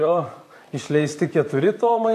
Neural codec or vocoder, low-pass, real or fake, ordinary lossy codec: vocoder, 44.1 kHz, 128 mel bands, Pupu-Vocoder; 14.4 kHz; fake; AAC, 64 kbps